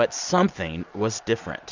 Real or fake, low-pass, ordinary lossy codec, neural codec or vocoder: real; 7.2 kHz; Opus, 64 kbps; none